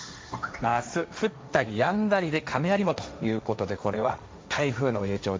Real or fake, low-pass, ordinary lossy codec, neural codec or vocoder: fake; none; none; codec, 16 kHz, 1.1 kbps, Voila-Tokenizer